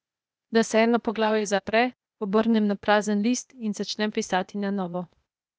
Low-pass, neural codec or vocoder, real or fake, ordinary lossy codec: none; codec, 16 kHz, 0.8 kbps, ZipCodec; fake; none